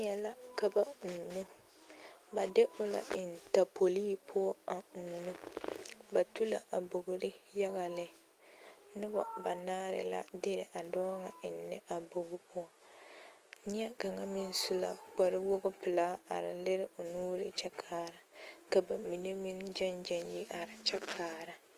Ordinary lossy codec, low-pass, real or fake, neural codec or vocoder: Opus, 24 kbps; 14.4 kHz; fake; autoencoder, 48 kHz, 128 numbers a frame, DAC-VAE, trained on Japanese speech